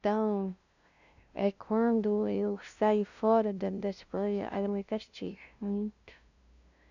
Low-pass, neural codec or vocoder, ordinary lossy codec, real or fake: 7.2 kHz; codec, 16 kHz, 0.5 kbps, FunCodec, trained on LibriTTS, 25 frames a second; none; fake